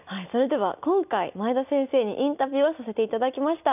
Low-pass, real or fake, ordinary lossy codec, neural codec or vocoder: 3.6 kHz; real; none; none